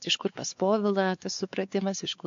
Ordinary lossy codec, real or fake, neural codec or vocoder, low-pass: MP3, 48 kbps; fake; codec, 16 kHz, 4 kbps, X-Codec, WavLM features, trained on Multilingual LibriSpeech; 7.2 kHz